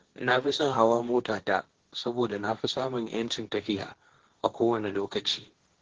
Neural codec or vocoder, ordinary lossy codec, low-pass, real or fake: codec, 16 kHz, 1.1 kbps, Voila-Tokenizer; Opus, 16 kbps; 7.2 kHz; fake